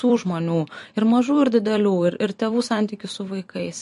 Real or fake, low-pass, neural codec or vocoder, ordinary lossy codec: fake; 14.4 kHz; vocoder, 44.1 kHz, 128 mel bands every 256 samples, BigVGAN v2; MP3, 48 kbps